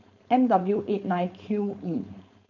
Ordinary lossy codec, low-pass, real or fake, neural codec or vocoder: none; 7.2 kHz; fake; codec, 16 kHz, 4.8 kbps, FACodec